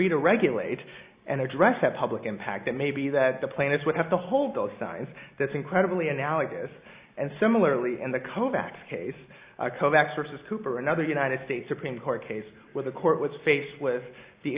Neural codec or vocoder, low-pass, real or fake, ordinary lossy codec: none; 3.6 kHz; real; Opus, 64 kbps